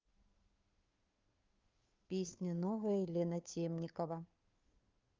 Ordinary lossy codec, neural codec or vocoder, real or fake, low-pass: Opus, 24 kbps; codec, 16 kHz, 2 kbps, FunCodec, trained on Chinese and English, 25 frames a second; fake; 7.2 kHz